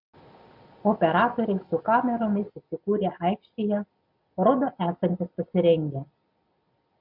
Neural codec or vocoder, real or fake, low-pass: none; real; 5.4 kHz